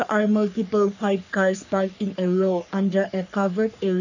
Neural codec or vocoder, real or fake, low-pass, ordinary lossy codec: codec, 44.1 kHz, 3.4 kbps, Pupu-Codec; fake; 7.2 kHz; none